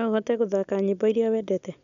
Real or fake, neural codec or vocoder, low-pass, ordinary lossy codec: real; none; 7.2 kHz; none